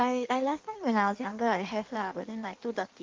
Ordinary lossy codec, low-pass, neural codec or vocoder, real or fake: Opus, 32 kbps; 7.2 kHz; codec, 16 kHz in and 24 kHz out, 1.1 kbps, FireRedTTS-2 codec; fake